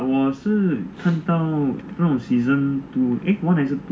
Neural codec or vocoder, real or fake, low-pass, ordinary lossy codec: none; real; none; none